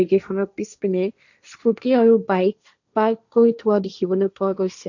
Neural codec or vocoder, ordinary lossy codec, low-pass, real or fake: codec, 16 kHz, 1.1 kbps, Voila-Tokenizer; none; 7.2 kHz; fake